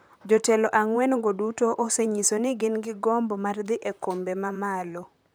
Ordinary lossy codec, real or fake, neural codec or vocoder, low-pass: none; fake; vocoder, 44.1 kHz, 128 mel bands, Pupu-Vocoder; none